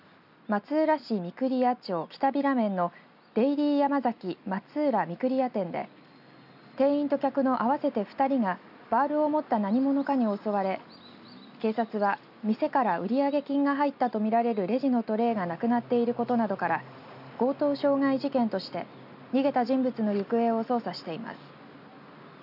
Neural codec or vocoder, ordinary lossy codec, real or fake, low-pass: none; none; real; 5.4 kHz